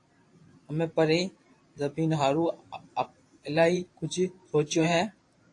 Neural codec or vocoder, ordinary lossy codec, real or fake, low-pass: vocoder, 44.1 kHz, 128 mel bands every 512 samples, BigVGAN v2; AAC, 48 kbps; fake; 10.8 kHz